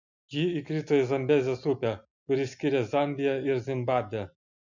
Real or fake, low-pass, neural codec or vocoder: real; 7.2 kHz; none